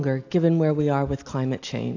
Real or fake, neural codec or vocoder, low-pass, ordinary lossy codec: real; none; 7.2 kHz; AAC, 48 kbps